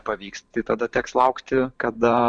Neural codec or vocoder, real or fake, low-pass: vocoder, 44.1 kHz, 128 mel bands every 512 samples, BigVGAN v2; fake; 9.9 kHz